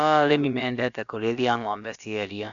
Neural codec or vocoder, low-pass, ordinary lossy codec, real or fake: codec, 16 kHz, about 1 kbps, DyCAST, with the encoder's durations; 7.2 kHz; none; fake